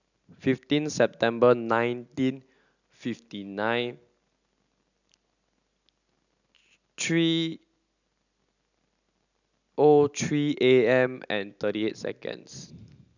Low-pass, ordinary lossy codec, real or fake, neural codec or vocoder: 7.2 kHz; none; real; none